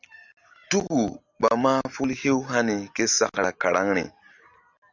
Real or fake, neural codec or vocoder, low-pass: real; none; 7.2 kHz